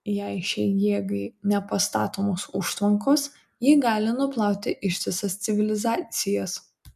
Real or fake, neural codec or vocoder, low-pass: real; none; 14.4 kHz